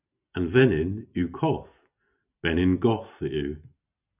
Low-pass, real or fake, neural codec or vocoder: 3.6 kHz; real; none